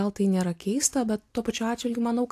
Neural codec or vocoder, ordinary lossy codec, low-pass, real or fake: none; AAC, 64 kbps; 14.4 kHz; real